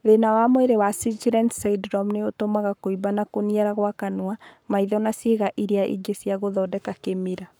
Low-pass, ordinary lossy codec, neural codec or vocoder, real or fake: none; none; codec, 44.1 kHz, 7.8 kbps, Pupu-Codec; fake